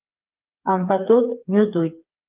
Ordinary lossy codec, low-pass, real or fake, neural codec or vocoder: Opus, 24 kbps; 3.6 kHz; fake; codec, 16 kHz, 4 kbps, FreqCodec, smaller model